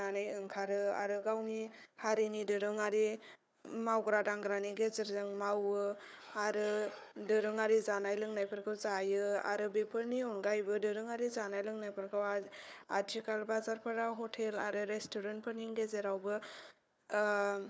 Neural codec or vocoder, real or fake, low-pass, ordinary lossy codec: codec, 16 kHz, 4 kbps, FunCodec, trained on Chinese and English, 50 frames a second; fake; none; none